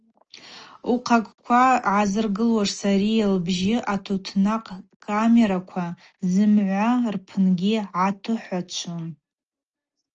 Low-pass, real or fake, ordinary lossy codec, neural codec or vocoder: 7.2 kHz; real; Opus, 24 kbps; none